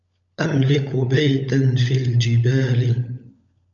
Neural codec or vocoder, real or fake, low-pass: codec, 16 kHz, 16 kbps, FunCodec, trained on LibriTTS, 50 frames a second; fake; 7.2 kHz